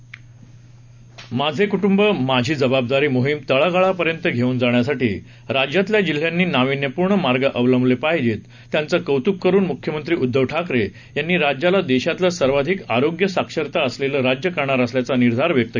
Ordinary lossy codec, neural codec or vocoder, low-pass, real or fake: none; none; 7.2 kHz; real